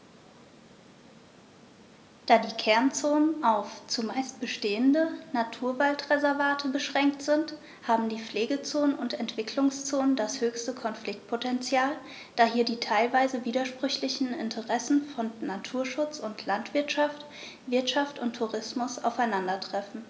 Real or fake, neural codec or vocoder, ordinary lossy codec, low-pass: real; none; none; none